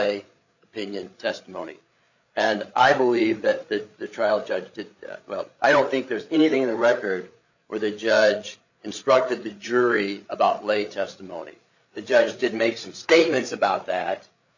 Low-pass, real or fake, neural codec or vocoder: 7.2 kHz; fake; codec, 16 kHz, 8 kbps, FreqCodec, larger model